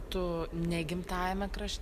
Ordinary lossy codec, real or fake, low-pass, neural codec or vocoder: MP3, 96 kbps; real; 14.4 kHz; none